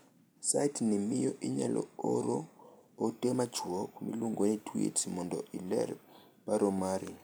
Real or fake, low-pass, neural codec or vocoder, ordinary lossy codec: real; none; none; none